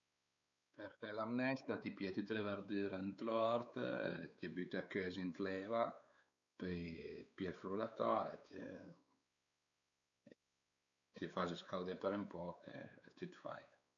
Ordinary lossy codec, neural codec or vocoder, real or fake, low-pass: none; codec, 16 kHz, 4 kbps, X-Codec, WavLM features, trained on Multilingual LibriSpeech; fake; 7.2 kHz